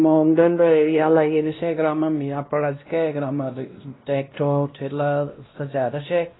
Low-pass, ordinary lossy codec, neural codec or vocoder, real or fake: 7.2 kHz; AAC, 16 kbps; codec, 16 kHz, 1 kbps, X-Codec, WavLM features, trained on Multilingual LibriSpeech; fake